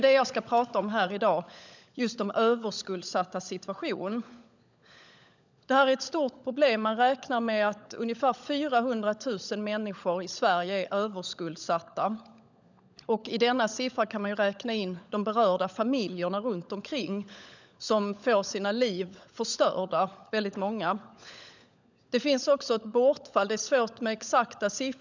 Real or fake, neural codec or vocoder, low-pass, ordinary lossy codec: fake; codec, 16 kHz, 16 kbps, FunCodec, trained on LibriTTS, 50 frames a second; 7.2 kHz; none